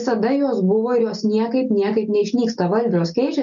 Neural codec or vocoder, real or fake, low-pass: none; real; 7.2 kHz